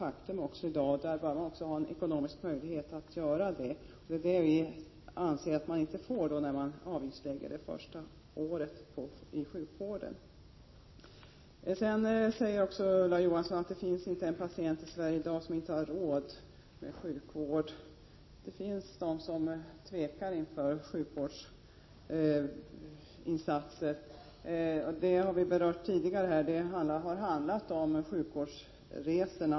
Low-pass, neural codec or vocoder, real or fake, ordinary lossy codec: 7.2 kHz; none; real; MP3, 24 kbps